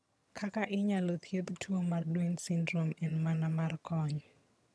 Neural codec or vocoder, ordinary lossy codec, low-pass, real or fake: vocoder, 22.05 kHz, 80 mel bands, HiFi-GAN; none; none; fake